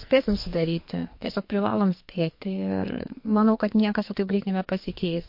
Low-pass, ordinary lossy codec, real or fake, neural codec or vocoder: 5.4 kHz; MP3, 32 kbps; fake; codec, 44.1 kHz, 2.6 kbps, SNAC